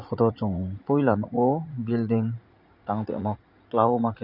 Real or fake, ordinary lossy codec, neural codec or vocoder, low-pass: fake; none; vocoder, 44.1 kHz, 80 mel bands, Vocos; 5.4 kHz